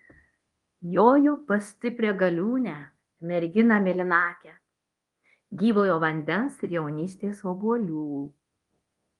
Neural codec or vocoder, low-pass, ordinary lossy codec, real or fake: codec, 24 kHz, 0.9 kbps, DualCodec; 10.8 kHz; Opus, 24 kbps; fake